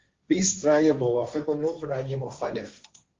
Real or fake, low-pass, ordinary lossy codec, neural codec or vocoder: fake; 7.2 kHz; Opus, 32 kbps; codec, 16 kHz, 1.1 kbps, Voila-Tokenizer